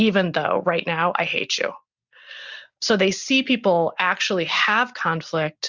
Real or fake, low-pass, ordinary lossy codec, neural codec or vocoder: real; 7.2 kHz; Opus, 64 kbps; none